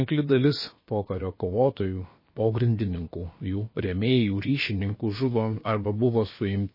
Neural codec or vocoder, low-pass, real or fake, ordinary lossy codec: codec, 16 kHz, about 1 kbps, DyCAST, with the encoder's durations; 5.4 kHz; fake; MP3, 24 kbps